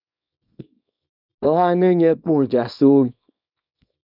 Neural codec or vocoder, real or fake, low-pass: codec, 24 kHz, 0.9 kbps, WavTokenizer, small release; fake; 5.4 kHz